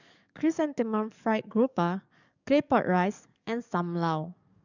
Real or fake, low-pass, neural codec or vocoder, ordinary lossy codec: fake; 7.2 kHz; codec, 44.1 kHz, 7.8 kbps, DAC; none